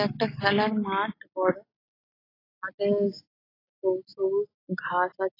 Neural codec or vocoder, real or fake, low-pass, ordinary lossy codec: none; real; 5.4 kHz; AAC, 24 kbps